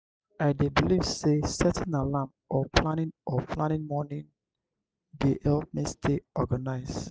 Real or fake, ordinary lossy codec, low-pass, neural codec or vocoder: real; none; none; none